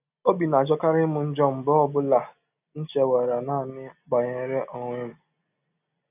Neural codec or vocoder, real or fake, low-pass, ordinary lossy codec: none; real; 3.6 kHz; none